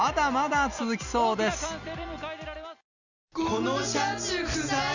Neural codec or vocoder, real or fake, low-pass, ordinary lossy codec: none; real; 7.2 kHz; none